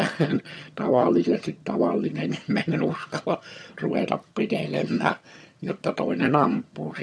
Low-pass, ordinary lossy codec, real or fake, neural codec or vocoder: none; none; fake; vocoder, 22.05 kHz, 80 mel bands, HiFi-GAN